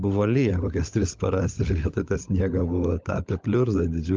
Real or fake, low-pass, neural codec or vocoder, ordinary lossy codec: fake; 7.2 kHz; codec, 16 kHz, 16 kbps, FunCodec, trained on LibriTTS, 50 frames a second; Opus, 24 kbps